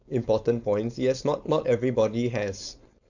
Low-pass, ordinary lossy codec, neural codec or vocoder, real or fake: 7.2 kHz; none; codec, 16 kHz, 4.8 kbps, FACodec; fake